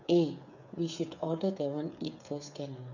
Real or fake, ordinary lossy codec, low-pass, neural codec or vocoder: fake; none; 7.2 kHz; codec, 16 kHz, 8 kbps, FreqCodec, smaller model